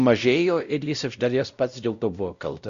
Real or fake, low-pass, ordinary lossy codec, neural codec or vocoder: fake; 7.2 kHz; Opus, 64 kbps; codec, 16 kHz, 0.5 kbps, X-Codec, WavLM features, trained on Multilingual LibriSpeech